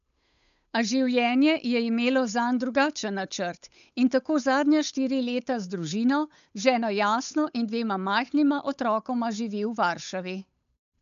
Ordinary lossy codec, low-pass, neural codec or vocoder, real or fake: none; 7.2 kHz; codec, 16 kHz, 8 kbps, FunCodec, trained on Chinese and English, 25 frames a second; fake